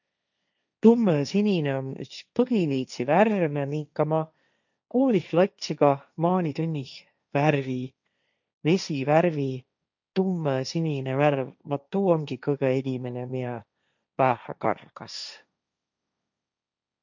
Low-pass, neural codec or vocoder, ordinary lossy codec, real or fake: 7.2 kHz; codec, 16 kHz, 1.1 kbps, Voila-Tokenizer; none; fake